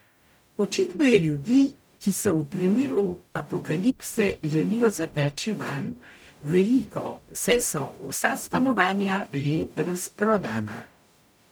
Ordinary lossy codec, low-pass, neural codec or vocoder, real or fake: none; none; codec, 44.1 kHz, 0.9 kbps, DAC; fake